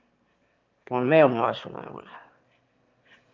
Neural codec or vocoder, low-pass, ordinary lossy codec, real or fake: autoencoder, 22.05 kHz, a latent of 192 numbers a frame, VITS, trained on one speaker; 7.2 kHz; Opus, 24 kbps; fake